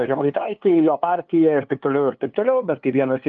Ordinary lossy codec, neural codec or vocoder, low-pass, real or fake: Opus, 32 kbps; codec, 16 kHz, 2 kbps, FunCodec, trained on LibriTTS, 25 frames a second; 7.2 kHz; fake